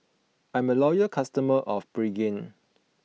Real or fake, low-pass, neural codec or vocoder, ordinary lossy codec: real; none; none; none